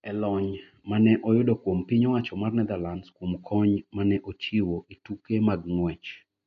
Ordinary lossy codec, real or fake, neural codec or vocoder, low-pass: MP3, 48 kbps; real; none; 7.2 kHz